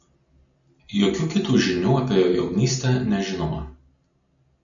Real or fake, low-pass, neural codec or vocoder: real; 7.2 kHz; none